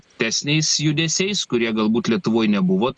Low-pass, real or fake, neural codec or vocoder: 9.9 kHz; real; none